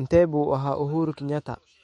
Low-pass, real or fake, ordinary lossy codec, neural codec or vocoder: 19.8 kHz; fake; MP3, 48 kbps; autoencoder, 48 kHz, 128 numbers a frame, DAC-VAE, trained on Japanese speech